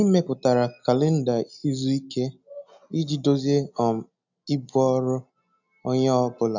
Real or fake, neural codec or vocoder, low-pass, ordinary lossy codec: real; none; 7.2 kHz; none